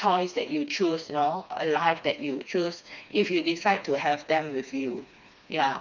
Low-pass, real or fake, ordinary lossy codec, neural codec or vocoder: 7.2 kHz; fake; none; codec, 16 kHz, 2 kbps, FreqCodec, smaller model